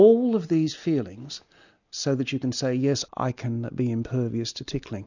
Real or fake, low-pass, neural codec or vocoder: real; 7.2 kHz; none